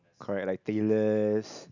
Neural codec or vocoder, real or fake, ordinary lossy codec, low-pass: none; real; none; 7.2 kHz